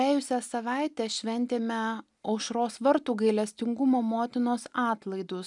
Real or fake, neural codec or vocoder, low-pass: real; none; 10.8 kHz